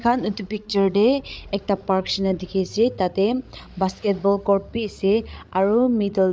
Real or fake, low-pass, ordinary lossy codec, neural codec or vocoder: fake; none; none; codec, 16 kHz, 16 kbps, FreqCodec, larger model